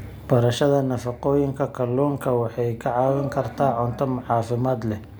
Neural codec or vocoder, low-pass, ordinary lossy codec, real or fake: none; none; none; real